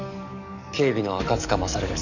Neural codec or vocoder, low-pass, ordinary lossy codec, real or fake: codec, 44.1 kHz, 7.8 kbps, DAC; 7.2 kHz; none; fake